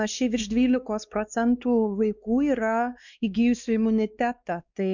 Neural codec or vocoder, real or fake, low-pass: codec, 16 kHz, 2 kbps, X-Codec, WavLM features, trained on Multilingual LibriSpeech; fake; 7.2 kHz